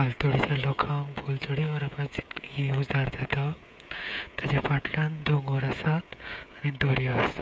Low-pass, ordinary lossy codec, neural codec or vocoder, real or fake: none; none; codec, 16 kHz, 16 kbps, FreqCodec, smaller model; fake